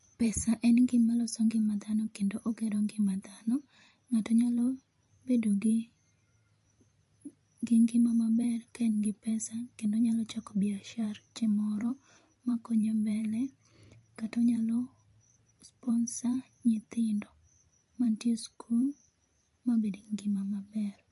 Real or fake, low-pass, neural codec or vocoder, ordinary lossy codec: fake; 14.4 kHz; vocoder, 44.1 kHz, 128 mel bands every 512 samples, BigVGAN v2; MP3, 48 kbps